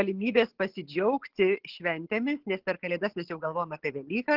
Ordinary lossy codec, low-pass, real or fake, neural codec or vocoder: Opus, 16 kbps; 5.4 kHz; real; none